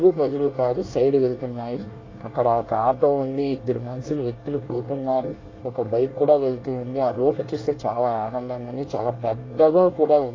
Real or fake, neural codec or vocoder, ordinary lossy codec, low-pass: fake; codec, 24 kHz, 1 kbps, SNAC; AAC, 32 kbps; 7.2 kHz